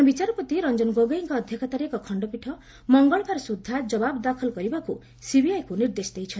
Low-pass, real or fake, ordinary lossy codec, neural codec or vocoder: none; real; none; none